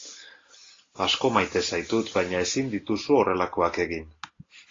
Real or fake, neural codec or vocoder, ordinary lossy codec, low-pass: real; none; AAC, 32 kbps; 7.2 kHz